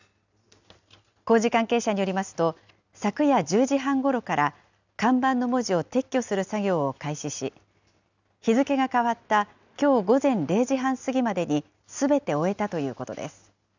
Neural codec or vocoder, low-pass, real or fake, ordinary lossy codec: none; 7.2 kHz; real; none